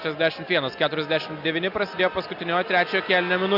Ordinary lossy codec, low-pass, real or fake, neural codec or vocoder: Opus, 64 kbps; 5.4 kHz; real; none